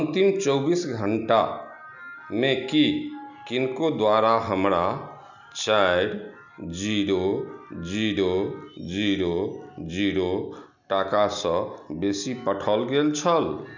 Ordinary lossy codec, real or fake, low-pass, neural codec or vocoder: none; real; 7.2 kHz; none